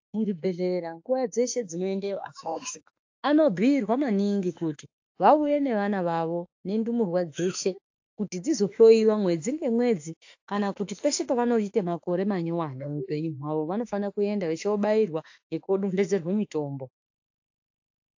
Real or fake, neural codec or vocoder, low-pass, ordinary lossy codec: fake; autoencoder, 48 kHz, 32 numbers a frame, DAC-VAE, trained on Japanese speech; 7.2 kHz; AAC, 48 kbps